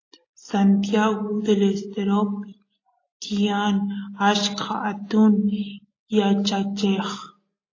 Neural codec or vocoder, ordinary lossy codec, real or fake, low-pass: none; AAC, 32 kbps; real; 7.2 kHz